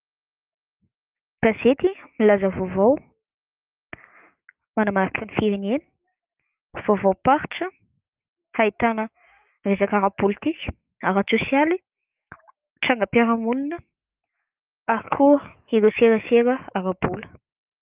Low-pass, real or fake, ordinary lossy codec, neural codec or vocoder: 3.6 kHz; fake; Opus, 32 kbps; autoencoder, 48 kHz, 128 numbers a frame, DAC-VAE, trained on Japanese speech